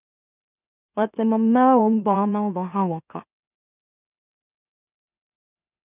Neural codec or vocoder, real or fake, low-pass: autoencoder, 44.1 kHz, a latent of 192 numbers a frame, MeloTTS; fake; 3.6 kHz